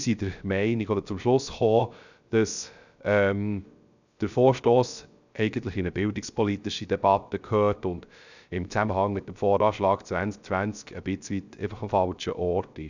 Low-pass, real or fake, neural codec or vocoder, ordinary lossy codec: 7.2 kHz; fake; codec, 16 kHz, 0.3 kbps, FocalCodec; none